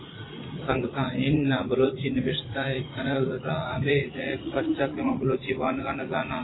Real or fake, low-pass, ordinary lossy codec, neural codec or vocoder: fake; 7.2 kHz; AAC, 16 kbps; vocoder, 44.1 kHz, 80 mel bands, Vocos